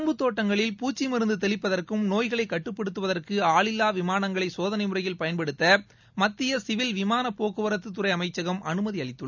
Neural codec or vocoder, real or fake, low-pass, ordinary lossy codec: none; real; 7.2 kHz; none